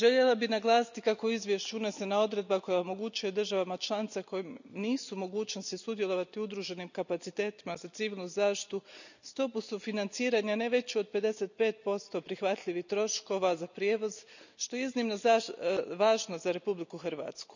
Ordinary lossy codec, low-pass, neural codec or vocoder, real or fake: none; 7.2 kHz; none; real